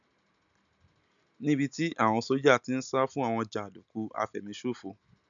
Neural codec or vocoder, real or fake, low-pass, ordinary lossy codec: none; real; 7.2 kHz; none